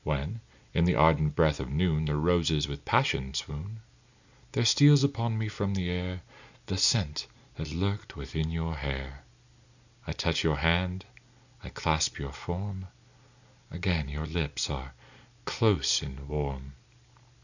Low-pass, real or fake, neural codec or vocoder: 7.2 kHz; real; none